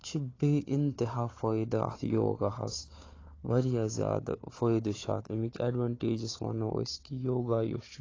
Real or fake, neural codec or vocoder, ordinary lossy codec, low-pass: fake; codec, 16 kHz, 4 kbps, FunCodec, trained on Chinese and English, 50 frames a second; AAC, 32 kbps; 7.2 kHz